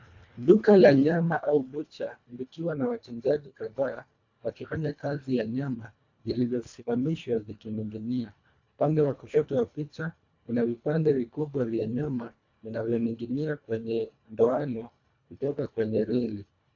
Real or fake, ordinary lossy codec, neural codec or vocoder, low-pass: fake; AAC, 48 kbps; codec, 24 kHz, 1.5 kbps, HILCodec; 7.2 kHz